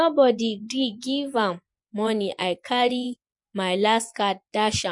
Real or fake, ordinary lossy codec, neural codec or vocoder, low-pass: fake; MP3, 64 kbps; vocoder, 24 kHz, 100 mel bands, Vocos; 10.8 kHz